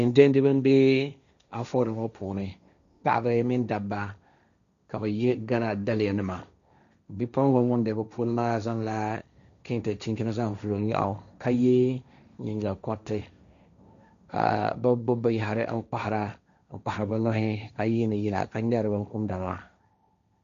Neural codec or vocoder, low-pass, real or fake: codec, 16 kHz, 1.1 kbps, Voila-Tokenizer; 7.2 kHz; fake